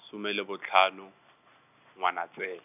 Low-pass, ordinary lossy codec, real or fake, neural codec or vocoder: 3.6 kHz; none; real; none